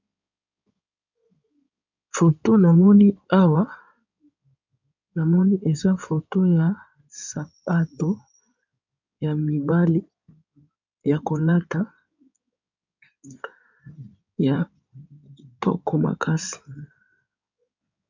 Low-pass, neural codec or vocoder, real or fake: 7.2 kHz; codec, 16 kHz in and 24 kHz out, 2.2 kbps, FireRedTTS-2 codec; fake